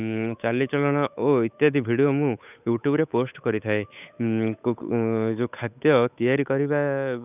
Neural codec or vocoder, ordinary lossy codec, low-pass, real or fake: codec, 16 kHz, 8 kbps, FunCodec, trained on Chinese and English, 25 frames a second; none; 3.6 kHz; fake